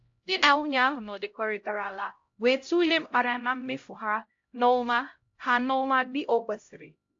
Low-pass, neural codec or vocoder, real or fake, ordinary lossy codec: 7.2 kHz; codec, 16 kHz, 0.5 kbps, X-Codec, HuBERT features, trained on LibriSpeech; fake; AAC, 48 kbps